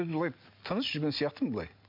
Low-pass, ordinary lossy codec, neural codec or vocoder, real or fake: 5.4 kHz; MP3, 48 kbps; none; real